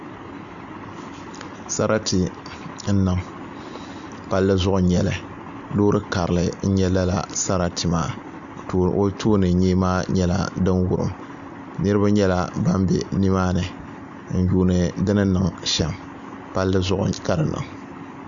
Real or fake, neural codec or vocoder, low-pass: real; none; 7.2 kHz